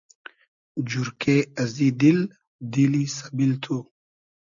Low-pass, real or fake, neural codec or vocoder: 7.2 kHz; real; none